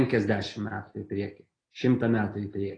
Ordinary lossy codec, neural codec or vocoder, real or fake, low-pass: AAC, 32 kbps; none; real; 9.9 kHz